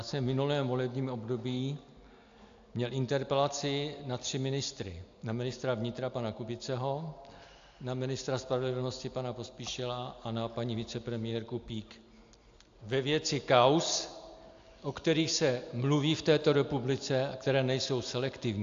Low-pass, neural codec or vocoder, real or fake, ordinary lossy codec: 7.2 kHz; none; real; AAC, 64 kbps